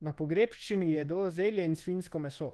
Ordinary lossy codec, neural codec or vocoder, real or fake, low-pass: Opus, 16 kbps; codec, 24 kHz, 1.2 kbps, DualCodec; fake; 10.8 kHz